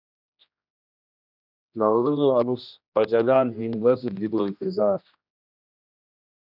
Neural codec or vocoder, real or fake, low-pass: codec, 16 kHz, 1 kbps, X-Codec, HuBERT features, trained on general audio; fake; 5.4 kHz